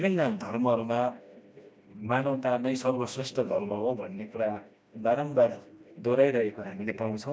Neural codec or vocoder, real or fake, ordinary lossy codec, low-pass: codec, 16 kHz, 1 kbps, FreqCodec, smaller model; fake; none; none